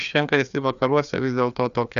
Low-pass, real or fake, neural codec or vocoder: 7.2 kHz; fake; codec, 16 kHz, 2 kbps, FreqCodec, larger model